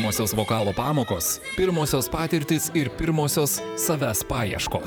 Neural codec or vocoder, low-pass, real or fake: vocoder, 44.1 kHz, 128 mel bands, Pupu-Vocoder; 19.8 kHz; fake